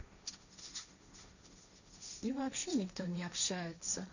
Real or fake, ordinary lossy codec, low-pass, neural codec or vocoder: fake; none; 7.2 kHz; codec, 16 kHz, 1.1 kbps, Voila-Tokenizer